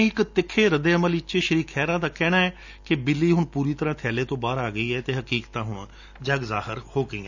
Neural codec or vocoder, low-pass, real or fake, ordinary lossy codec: none; 7.2 kHz; real; none